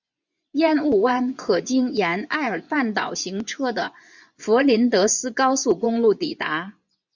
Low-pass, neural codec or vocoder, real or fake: 7.2 kHz; vocoder, 24 kHz, 100 mel bands, Vocos; fake